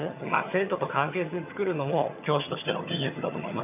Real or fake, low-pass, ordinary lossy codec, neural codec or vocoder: fake; 3.6 kHz; none; vocoder, 22.05 kHz, 80 mel bands, HiFi-GAN